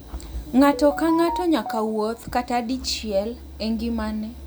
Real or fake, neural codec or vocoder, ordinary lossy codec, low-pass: real; none; none; none